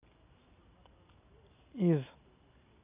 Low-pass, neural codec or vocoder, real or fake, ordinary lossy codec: 3.6 kHz; none; real; none